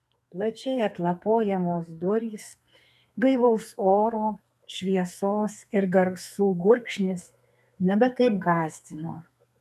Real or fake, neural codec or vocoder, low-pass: fake; codec, 32 kHz, 1.9 kbps, SNAC; 14.4 kHz